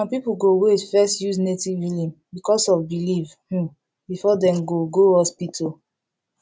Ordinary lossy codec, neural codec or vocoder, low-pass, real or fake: none; none; none; real